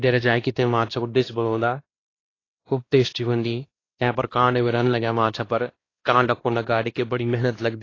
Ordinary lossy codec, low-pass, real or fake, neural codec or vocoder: AAC, 32 kbps; 7.2 kHz; fake; codec, 16 kHz, 1 kbps, X-Codec, WavLM features, trained on Multilingual LibriSpeech